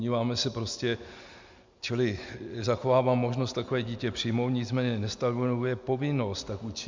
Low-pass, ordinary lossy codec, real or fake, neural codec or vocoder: 7.2 kHz; MP3, 64 kbps; real; none